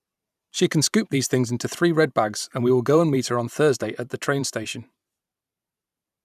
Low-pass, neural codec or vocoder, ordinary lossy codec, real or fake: 14.4 kHz; vocoder, 44.1 kHz, 128 mel bands every 512 samples, BigVGAN v2; none; fake